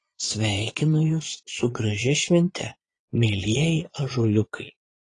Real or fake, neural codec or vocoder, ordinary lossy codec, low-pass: fake; vocoder, 22.05 kHz, 80 mel bands, Vocos; AAC, 32 kbps; 9.9 kHz